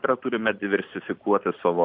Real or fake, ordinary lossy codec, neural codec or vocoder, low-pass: fake; MP3, 48 kbps; vocoder, 44.1 kHz, 128 mel bands every 512 samples, BigVGAN v2; 5.4 kHz